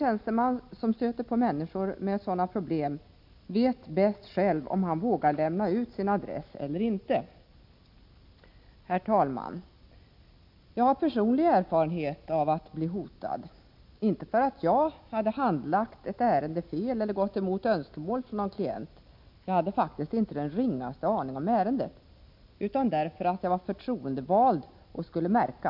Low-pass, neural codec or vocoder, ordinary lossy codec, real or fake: 5.4 kHz; none; MP3, 48 kbps; real